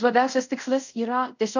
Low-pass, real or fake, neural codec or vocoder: 7.2 kHz; fake; codec, 24 kHz, 0.5 kbps, DualCodec